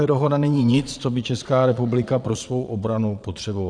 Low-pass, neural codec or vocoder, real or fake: 9.9 kHz; vocoder, 22.05 kHz, 80 mel bands, Vocos; fake